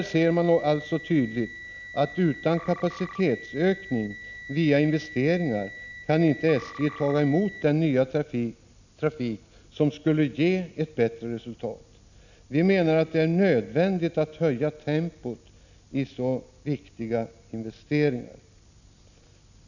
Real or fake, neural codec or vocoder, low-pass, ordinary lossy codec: real; none; 7.2 kHz; none